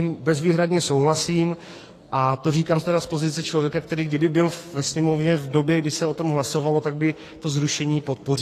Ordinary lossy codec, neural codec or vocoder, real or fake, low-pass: AAC, 48 kbps; codec, 44.1 kHz, 2.6 kbps, SNAC; fake; 14.4 kHz